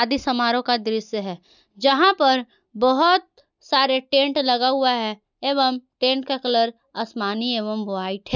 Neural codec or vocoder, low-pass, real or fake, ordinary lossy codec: none; 7.2 kHz; real; none